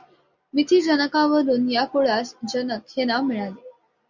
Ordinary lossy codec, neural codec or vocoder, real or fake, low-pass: MP3, 64 kbps; none; real; 7.2 kHz